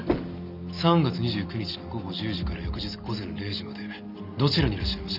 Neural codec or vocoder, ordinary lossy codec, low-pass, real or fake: none; none; 5.4 kHz; real